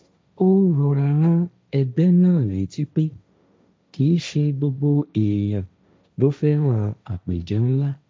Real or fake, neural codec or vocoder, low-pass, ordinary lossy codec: fake; codec, 16 kHz, 1.1 kbps, Voila-Tokenizer; none; none